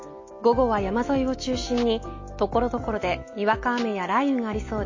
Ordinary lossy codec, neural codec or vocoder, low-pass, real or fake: MP3, 32 kbps; none; 7.2 kHz; real